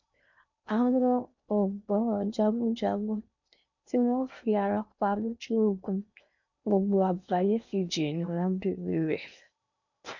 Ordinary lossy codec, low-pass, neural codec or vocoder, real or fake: none; 7.2 kHz; codec, 16 kHz in and 24 kHz out, 0.8 kbps, FocalCodec, streaming, 65536 codes; fake